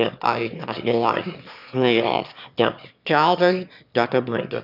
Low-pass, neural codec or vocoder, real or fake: 5.4 kHz; autoencoder, 22.05 kHz, a latent of 192 numbers a frame, VITS, trained on one speaker; fake